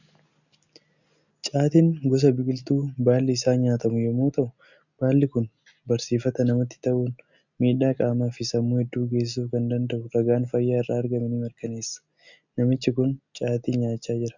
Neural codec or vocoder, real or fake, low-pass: none; real; 7.2 kHz